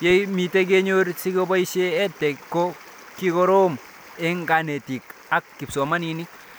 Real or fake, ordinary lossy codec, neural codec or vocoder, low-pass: real; none; none; none